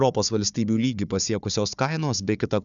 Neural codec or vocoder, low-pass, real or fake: codec, 16 kHz, 4 kbps, FunCodec, trained on Chinese and English, 50 frames a second; 7.2 kHz; fake